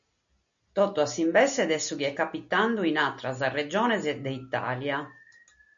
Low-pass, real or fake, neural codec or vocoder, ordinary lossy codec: 7.2 kHz; real; none; MP3, 64 kbps